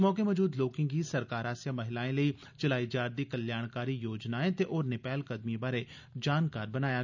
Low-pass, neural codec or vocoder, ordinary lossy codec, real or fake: 7.2 kHz; none; none; real